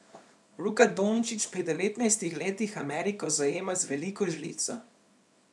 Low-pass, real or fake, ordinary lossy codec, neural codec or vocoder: none; fake; none; codec, 24 kHz, 0.9 kbps, WavTokenizer, small release